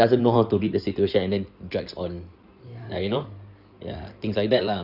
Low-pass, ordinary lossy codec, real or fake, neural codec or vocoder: 5.4 kHz; none; fake; codec, 16 kHz in and 24 kHz out, 2.2 kbps, FireRedTTS-2 codec